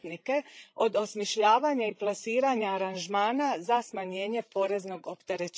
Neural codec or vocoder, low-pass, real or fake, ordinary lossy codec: codec, 16 kHz, 16 kbps, FreqCodec, larger model; none; fake; none